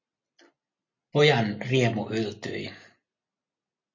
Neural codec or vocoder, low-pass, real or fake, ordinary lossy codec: none; 7.2 kHz; real; MP3, 48 kbps